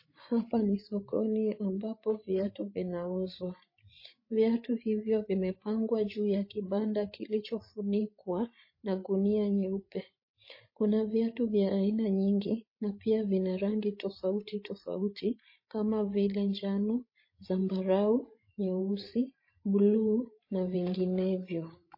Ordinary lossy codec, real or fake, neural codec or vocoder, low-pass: MP3, 24 kbps; fake; codec, 16 kHz, 16 kbps, FreqCodec, larger model; 5.4 kHz